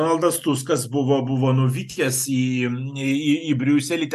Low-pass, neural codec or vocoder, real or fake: 14.4 kHz; none; real